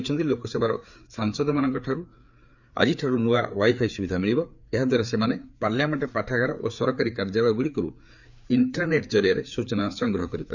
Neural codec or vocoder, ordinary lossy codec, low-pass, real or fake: codec, 16 kHz, 4 kbps, FreqCodec, larger model; none; 7.2 kHz; fake